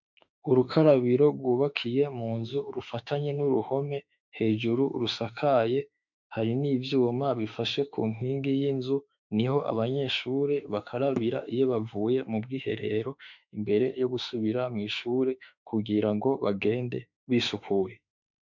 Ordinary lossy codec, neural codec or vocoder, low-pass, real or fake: MP3, 64 kbps; autoencoder, 48 kHz, 32 numbers a frame, DAC-VAE, trained on Japanese speech; 7.2 kHz; fake